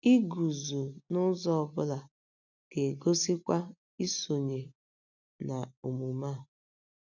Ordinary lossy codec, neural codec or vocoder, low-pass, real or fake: none; none; 7.2 kHz; real